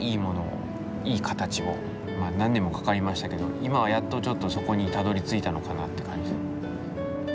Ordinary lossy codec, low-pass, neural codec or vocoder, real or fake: none; none; none; real